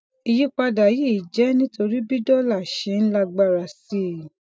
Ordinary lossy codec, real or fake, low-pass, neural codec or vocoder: none; real; none; none